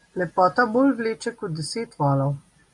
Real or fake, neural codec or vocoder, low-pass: real; none; 10.8 kHz